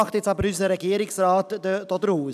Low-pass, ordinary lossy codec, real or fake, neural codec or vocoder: 14.4 kHz; none; real; none